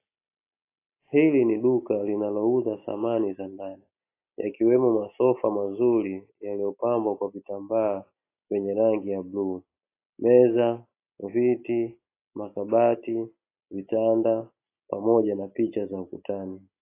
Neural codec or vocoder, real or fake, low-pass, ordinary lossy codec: none; real; 3.6 kHz; AAC, 24 kbps